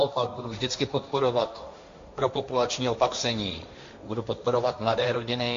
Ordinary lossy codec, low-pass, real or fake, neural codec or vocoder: AAC, 48 kbps; 7.2 kHz; fake; codec, 16 kHz, 1.1 kbps, Voila-Tokenizer